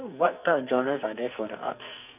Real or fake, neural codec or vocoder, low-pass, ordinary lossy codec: fake; codec, 44.1 kHz, 2.6 kbps, SNAC; 3.6 kHz; none